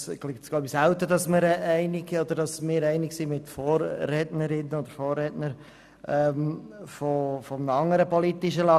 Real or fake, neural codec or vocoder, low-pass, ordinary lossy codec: real; none; 14.4 kHz; none